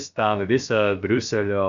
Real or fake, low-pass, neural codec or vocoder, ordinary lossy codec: fake; 7.2 kHz; codec, 16 kHz, about 1 kbps, DyCAST, with the encoder's durations; MP3, 96 kbps